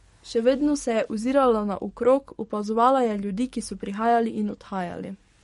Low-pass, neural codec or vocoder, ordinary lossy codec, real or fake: 19.8 kHz; codec, 44.1 kHz, 7.8 kbps, DAC; MP3, 48 kbps; fake